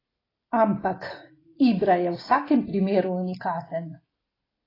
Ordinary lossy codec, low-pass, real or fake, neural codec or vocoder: AAC, 24 kbps; 5.4 kHz; real; none